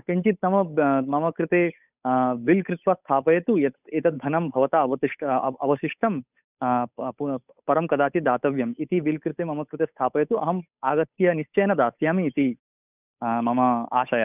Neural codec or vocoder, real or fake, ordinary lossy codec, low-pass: codec, 16 kHz, 8 kbps, FunCodec, trained on Chinese and English, 25 frames a second; fake; none; 3.6 kHz